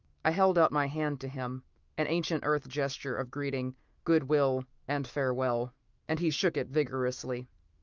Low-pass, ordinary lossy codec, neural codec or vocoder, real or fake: 7.2 kHz; Opus, 32 kbps; autoencoder, 48 kHz, 128 numbers a frame, DAC-VAE, trained on Japanese speech; fake